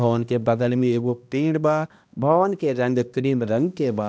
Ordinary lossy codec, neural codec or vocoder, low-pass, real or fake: none; codec, 16 kHz, 1 kbps, X-Codec, HuBERT features, trained on balanced general audio; none; fake